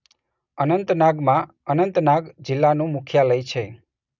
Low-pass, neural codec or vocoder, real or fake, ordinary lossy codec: 7.2 kHz; none; real; none